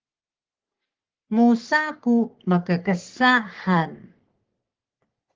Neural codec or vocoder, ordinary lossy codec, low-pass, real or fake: codec, 44.1 kHz, 3.4 kbps, Pupu-Codec; Opus, 16 kbps; 7.2 kHz; fake